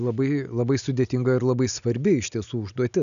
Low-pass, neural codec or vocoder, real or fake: 7.2 kHz; none; real